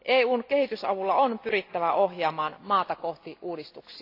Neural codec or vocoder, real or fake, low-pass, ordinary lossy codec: none; real; 5.4 kHz; AAC, 32 kbps